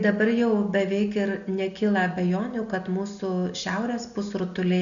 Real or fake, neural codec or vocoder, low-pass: real; none; 7.2 kHz